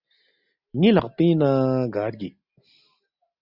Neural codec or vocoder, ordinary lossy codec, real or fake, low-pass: none; Opus, 64 kbps; real; 5.4 kHz